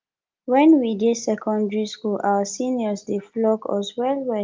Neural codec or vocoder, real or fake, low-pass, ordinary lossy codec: none; real; 7.2 kHz; Opus, 24 kbps